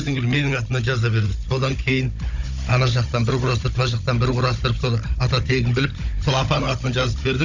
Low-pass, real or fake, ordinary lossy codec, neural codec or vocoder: 7.2 kHz; fake; none; codec, 16 kHz, 16 kbps, FunCodec, trained on Chinese and English, 50 frames a second